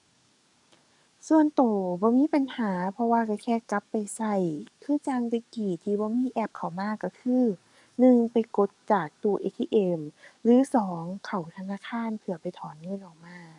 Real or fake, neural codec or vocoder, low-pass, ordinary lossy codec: fake; codec, 44.1 kHz, 7.8 kbps, DAC; 10.8 kHz; none